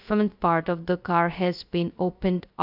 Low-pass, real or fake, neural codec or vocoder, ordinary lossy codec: 5.4 kHz; fake; codec, 16 kHz, 0.2 kbps, FocalCodec; none